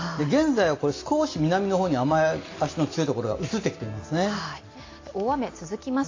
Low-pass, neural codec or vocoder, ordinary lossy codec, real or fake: 7.2 kHz; none; AAC, 32 kbps; real